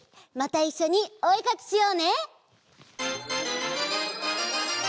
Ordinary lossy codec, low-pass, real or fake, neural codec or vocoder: none; none; real; none